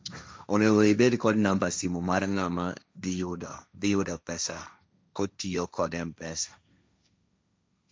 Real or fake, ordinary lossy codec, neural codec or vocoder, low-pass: fake; none; codec, 16 kHz, 1.1 kbps, Voila-Tokenizer; none